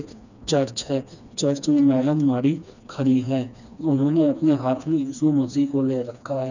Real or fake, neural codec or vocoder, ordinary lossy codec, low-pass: fake; codec, 16 kHz, 2 kbps, FreqCodec, smaller model; none; 7.2 kHz